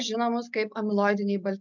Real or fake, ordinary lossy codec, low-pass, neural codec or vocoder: real; MP3, 64 kbps; 7.2 kHz; none